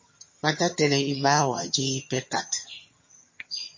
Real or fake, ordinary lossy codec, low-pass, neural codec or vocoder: fake; MP3, 32 kbps; 7.2 kHz; vocoder, 22.05 kHz, 80 mel bands, HiFi-GAN